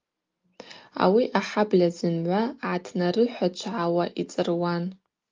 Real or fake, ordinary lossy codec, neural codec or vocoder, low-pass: real; Opus, 24 kbps; none; 7.2 kHz